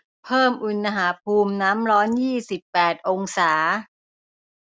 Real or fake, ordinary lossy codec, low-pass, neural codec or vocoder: real; none; none; none